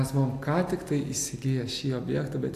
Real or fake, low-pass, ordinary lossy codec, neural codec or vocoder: real; 14.4 kHz; AAC, 64 kbps; none